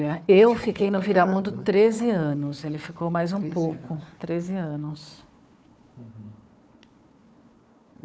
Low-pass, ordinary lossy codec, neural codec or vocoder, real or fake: none; none; codec, 16 kHz, 4 kbps, FunCodec, trained on Chinese and English, 50 frames a second; fake